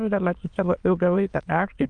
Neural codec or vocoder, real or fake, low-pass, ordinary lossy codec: autoencoder, 22.05 kHz, a latent of 192 numbers a frame, VITS, trained on many speakers; fake; 9.9 kHz; Opus, 24 kbps